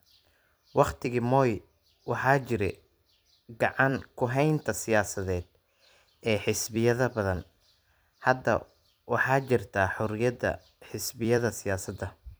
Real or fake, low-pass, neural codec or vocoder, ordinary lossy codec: fake; none; vocoder, 44.1 kHz, 128 mel bands every 256 samples, BigVGAN v2; none